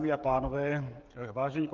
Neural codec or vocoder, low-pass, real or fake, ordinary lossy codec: codec, 16 kHz, 16 kbps, FreqCodec, smaller model; 7.2 kHz; fake; Opus, 32 kbps